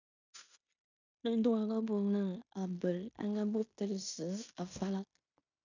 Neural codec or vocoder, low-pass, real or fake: codec, 16 kHz in and 24 kHz out, 0.9 kbps, LongCat-Audio-Codec, fine tuned four codebook decoder; 7.2 kHz; fake